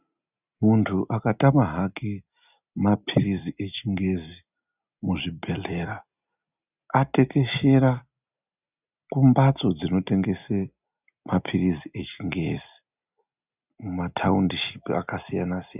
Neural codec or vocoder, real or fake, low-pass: none; real; 3.6 kHz